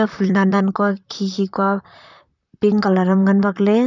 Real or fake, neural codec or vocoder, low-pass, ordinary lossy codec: fake; vocoder, 44.1 kHz, 128 mel bands, Pupu-Vocoder; 7.2 kHz; none